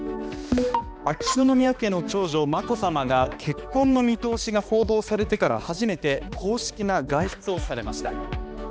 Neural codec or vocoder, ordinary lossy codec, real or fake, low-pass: codec, 16 kHz, 2 kbps, X-Codec, HuBERT features, trained on balanced general audio; none; fake; none